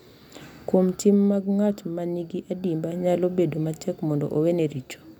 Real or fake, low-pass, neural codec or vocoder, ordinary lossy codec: real; 19.8 kHz; none; none